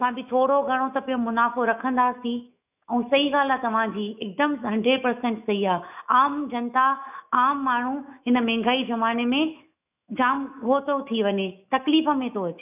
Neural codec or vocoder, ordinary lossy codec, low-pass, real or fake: autoencoder, 48 kHz, 128 numbers a frame, DAC-VAE, trained on Japanese speech; none; 3.6 kHz; fake